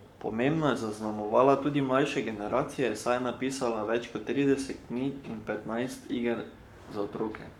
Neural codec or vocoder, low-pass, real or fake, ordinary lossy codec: codec, 44.1 kHz, 7.8 kbps, Pupu-Codec; 19.8 kHz; fake; none